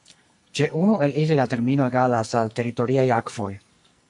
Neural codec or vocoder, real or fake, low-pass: codec, 44.1 kHz, 2.6 kbps, SNAC; fake; 10.8 kHz